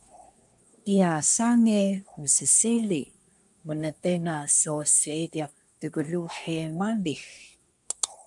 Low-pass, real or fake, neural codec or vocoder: 10.8 kHz; fake; codec, 24 kHz, 1 kbps, SNAC